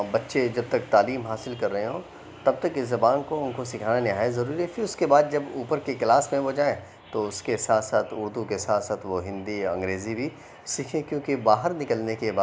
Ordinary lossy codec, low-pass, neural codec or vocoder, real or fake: none; none; none; real